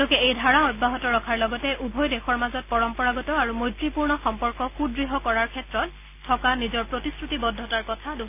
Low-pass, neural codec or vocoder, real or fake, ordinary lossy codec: 3.6 kHz; none; real; none